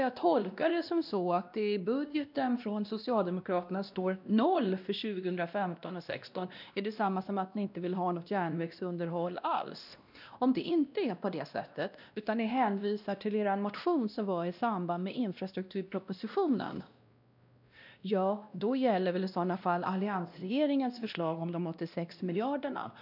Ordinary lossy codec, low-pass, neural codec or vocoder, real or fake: none; 5.4 kHz; codec, 16 kHz, 1 kbps, X-Codec, WavLM features, trained on Multilingual LibriSpeech; fake